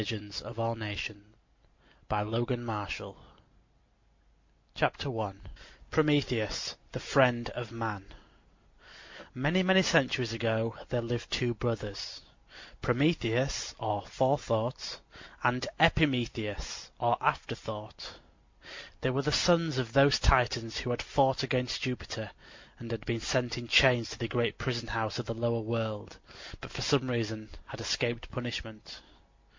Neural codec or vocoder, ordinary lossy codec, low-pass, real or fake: none; MP3, 48 kbps; 7.2 kHz; real